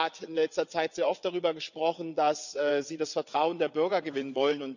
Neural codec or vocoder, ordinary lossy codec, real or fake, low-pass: vocoder, 22.05 kHz, 80 mel bands, WaveNeXt; none; fake; 7.2 kHz